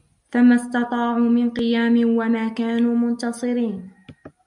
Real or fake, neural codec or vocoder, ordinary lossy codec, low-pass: real; none; MP3, 96 kbps; 10.8 kHz